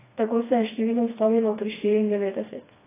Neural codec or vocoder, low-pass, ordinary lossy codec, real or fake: codec, 16 kHz, 2 kbps, FreqCodec, smaller model; 3.6 kHz; AAC, 16 kbps; fake